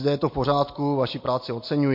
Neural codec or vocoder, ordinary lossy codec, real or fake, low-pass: none; MP3, 32 kbps; real; 5.4 kHz